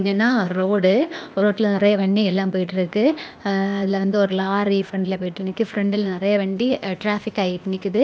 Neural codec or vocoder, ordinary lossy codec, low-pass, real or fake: codec, 16 kHz, 0.8 kbps, ZipCodec; none; none; fake